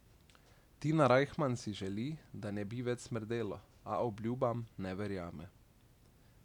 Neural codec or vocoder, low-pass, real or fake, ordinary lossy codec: none; 19.8 kHz; real; none